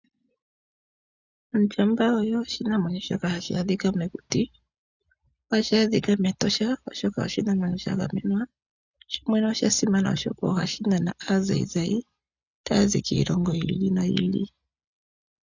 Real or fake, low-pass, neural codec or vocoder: real; 7.2 kHz; none